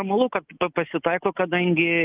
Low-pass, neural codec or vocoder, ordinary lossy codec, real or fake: 3.6 kHz; none; Opus, 24 kbps; real